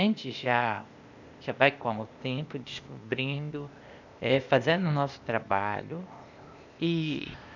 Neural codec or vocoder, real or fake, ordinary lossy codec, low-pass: codec, 16 kHz, 0.8 kbps, ZipCodec; fake; none; 7.2 kHz